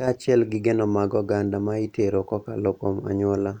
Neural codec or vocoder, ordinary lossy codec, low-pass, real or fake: none; none; 19.8 kHz; real